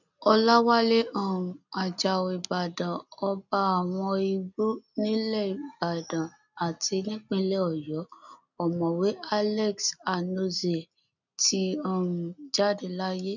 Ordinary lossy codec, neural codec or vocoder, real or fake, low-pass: none; none; real; 7.2 kHz